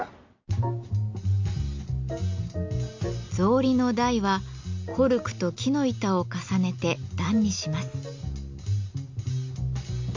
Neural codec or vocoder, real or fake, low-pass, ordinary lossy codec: none; real; 7.2 kHz; MP3, 48 kbps